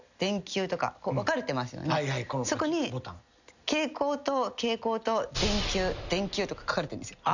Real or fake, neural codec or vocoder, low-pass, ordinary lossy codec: real; none; 7.2 kHz; none